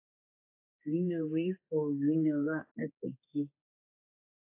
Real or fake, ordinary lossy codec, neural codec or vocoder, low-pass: fake; AAC, 24 kbps; codec, 16 kHz, 4 kbps, X-Codec, HuBERT features, trained on general audio; 3.6 kHz